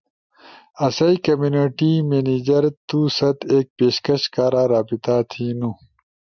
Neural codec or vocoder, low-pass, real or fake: none; 7.2 kHz; real